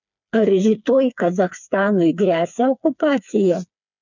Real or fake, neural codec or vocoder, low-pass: fake; codec, 16 kHz, 4 kbps, FreqCodec, smaller model; 7.2 kHz